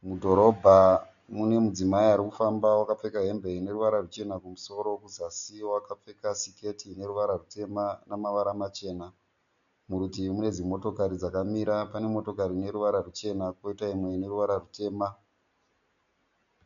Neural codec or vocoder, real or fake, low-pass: none; real; 7.2 kHz